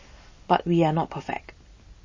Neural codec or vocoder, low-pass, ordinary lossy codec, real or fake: none; 7.2 kHz; MP3, 32 kbps; real